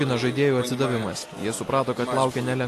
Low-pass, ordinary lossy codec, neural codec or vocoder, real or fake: 14.4 kHz; AAC, 48 kbps; none; real